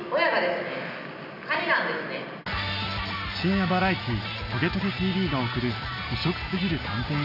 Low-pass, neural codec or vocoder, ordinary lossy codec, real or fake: 5.4 kHz; none; none; real